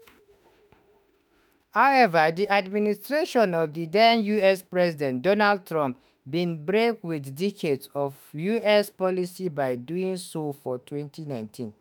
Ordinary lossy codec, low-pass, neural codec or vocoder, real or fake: none; none; autoencoder, 48 kHz, 32 numbers a frame, DAC-VAE, trained on Japanese speech; fake